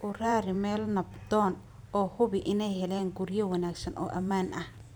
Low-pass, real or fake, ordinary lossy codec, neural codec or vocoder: none; fake; none; vocoder, 44.1 kHz, 128 mel bands every 512 samples, BigVGAN v2